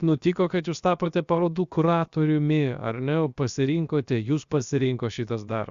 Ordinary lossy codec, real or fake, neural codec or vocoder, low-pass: Opus, 64 kbps; fake; codec, 16 kHz, about 1 kbps, DyCAST, with the encoder's durations; 7.2 kHz